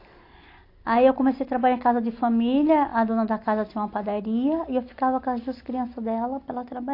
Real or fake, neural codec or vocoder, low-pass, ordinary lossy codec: real; none; 5.4 kHz; AAC, 32 kbps